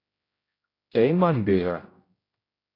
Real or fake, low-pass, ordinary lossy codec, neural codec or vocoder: fake; 5.4 kHz; AAC, 24 kbps; codec, 16 kHz, 0.5 kbps, X-Codec, HuBERT features, trained on general audio